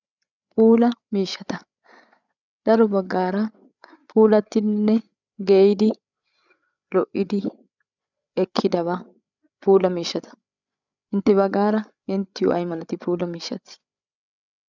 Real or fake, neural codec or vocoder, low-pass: fake; codec, 16 kHz, 8 kbps, FreqCodec, larger model; 7.2 kHz